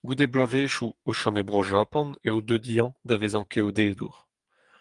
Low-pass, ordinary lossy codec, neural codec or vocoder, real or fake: 10.8 kHz; Opus, 32 kbps; codec, 44.1 kHz, 2.6 kbps, SNAC; fake